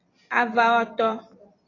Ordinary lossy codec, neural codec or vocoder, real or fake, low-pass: AAC, 32 kbps; vocoder, 44.1 kHz, 128 mel bands every 256 samples, BigVGAN v2; fake; 7.2 kHz